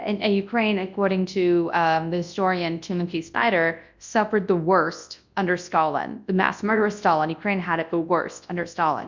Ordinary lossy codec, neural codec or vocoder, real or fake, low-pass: MP3, 64 kbps; codec, 24 kHz, 0.9 kbps, WavTokenizer, large speech release; fake; 7.2 kHz